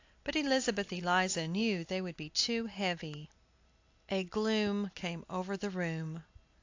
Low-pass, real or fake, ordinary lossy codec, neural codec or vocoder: 7.2 kHz; real; AAC, 48 kbps; none